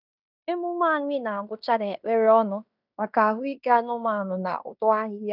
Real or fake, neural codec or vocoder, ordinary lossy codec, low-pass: fake; codec, 16 kHz in and 24 kHz out, 0.9 kbps, LongCat-Audio-Codec, fine tuned four codebook decoder; none; 5.4 kHz